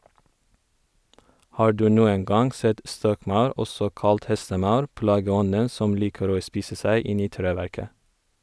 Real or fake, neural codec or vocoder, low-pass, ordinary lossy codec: real; none; none; none